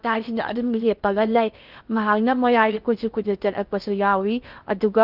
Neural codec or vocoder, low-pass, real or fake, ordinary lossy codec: codec, 16 kHz in and 24 kHz out, 0.6 kbps, FocalCodec, streaming, 2048 codes; 5.4 kHz; fake; Opus, 24 kbps